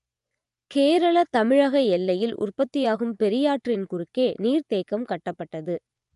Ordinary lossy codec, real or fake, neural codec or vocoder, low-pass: none; fake; vocoder, 24 kHz, 100 mel bands, Vocos; 10.8 kHz